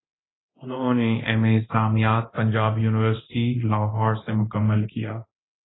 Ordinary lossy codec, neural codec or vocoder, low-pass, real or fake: AAC, 16 kbps; codec, 24 kHz, 0.9 kbps, DualCodec; 7.2 kHz; fake